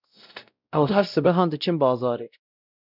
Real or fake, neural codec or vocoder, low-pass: fake; codec, 16 kHz, 0.5 kbps, X-Codec, WavLM features, trained on Multilingual LibriSpeech; 5.4 kHz